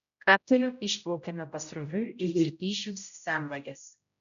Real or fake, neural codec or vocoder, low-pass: fake; codec, 16 kHz, 0.5 kbps, X-Codec, HuBERT features, trained on general audio; 7.2 kHz